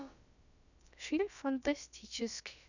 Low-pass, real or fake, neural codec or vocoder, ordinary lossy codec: 7.2 kHz; fake; codec, 16 kHz, about 1 kbps, DyCAST, with the encoder's durations; none